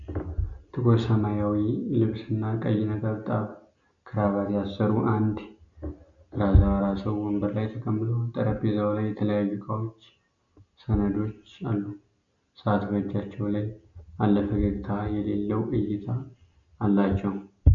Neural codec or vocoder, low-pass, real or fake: none; 7.2 kHz; real